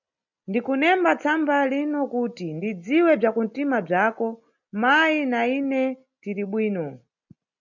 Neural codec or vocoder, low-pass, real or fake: none; 7.2 kHz; real